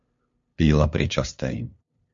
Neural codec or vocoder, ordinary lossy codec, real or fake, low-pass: codec, 16 kHz, 2 kbps, FunCodec, trained on LibriTTS, 25 frames a second; MP3, 48 kbps; fake; 7.2 kHz